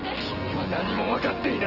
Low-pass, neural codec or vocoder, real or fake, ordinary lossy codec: 5.4 kHz; vocoder, 24 kHz, 100 mel bands, Vocos; fake; Opus, 16 kbps